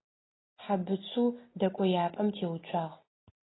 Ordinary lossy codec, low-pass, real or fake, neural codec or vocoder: AAC, 16 kbps; 7.2 kHz; real; none